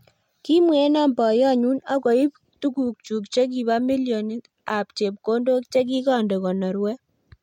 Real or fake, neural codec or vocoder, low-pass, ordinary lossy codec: real; none; 19.8 kHz; MP3, 64 kbps